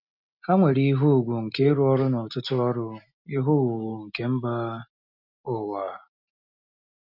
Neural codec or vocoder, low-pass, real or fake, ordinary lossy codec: none; 5.4 kHz; real; none